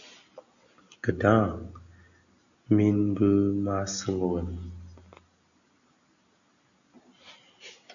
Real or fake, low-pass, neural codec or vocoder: real; 7.2 kHz; none